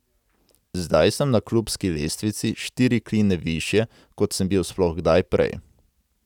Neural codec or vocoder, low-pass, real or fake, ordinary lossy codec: none; 19.8 kHz; real; none